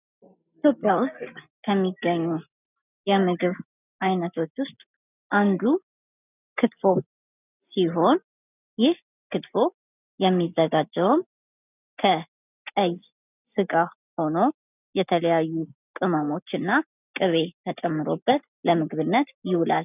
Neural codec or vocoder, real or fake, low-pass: none; real; 3.6 kHz